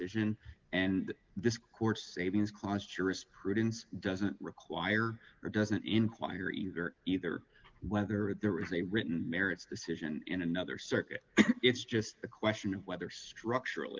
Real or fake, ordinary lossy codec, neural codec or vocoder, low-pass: real; Opus, 24 kbps; none; 7.2 kHz